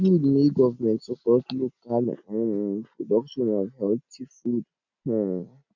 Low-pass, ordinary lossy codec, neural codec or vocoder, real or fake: 7.2 kHz; none; none; real